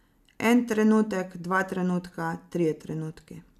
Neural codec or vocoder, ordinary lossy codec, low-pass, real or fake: none; none; 14.4 kHz; real